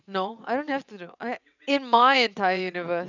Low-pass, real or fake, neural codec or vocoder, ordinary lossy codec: 7.2 kHz; fake; vocoder, 22.05 kHz, 80 mel bands, WaveNeXt; none